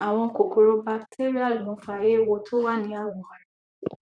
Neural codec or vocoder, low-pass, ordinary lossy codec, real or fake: vocoder, 44.1 kHz, 128 mel bands, Pupu-Vocoder; 9.9 kHz; none; fake